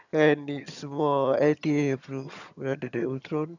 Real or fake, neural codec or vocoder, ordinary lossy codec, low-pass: fake; vocoder, 22.05 kHz, 80 mel bands, HiFi-GAN; none; 7.2 kHz